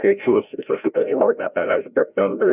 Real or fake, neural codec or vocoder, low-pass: fake; codec, 16 kHz, 0.5 kbps, FreqCodec, larger model; 3.6 kHz